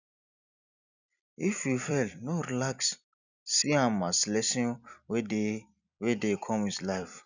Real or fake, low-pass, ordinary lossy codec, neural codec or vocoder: fake; 7.2 kHz; none; vocoder, 44.1 kHz, 128 mel bands every 256 samples, BigVGAN v2